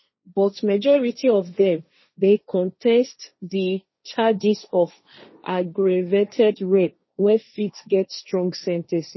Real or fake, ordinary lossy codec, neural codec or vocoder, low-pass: fake; MP3, 24 kbps; codec, 16 kHz, 1.1 kbps, Voila-Tokenizer; 7.2 kHz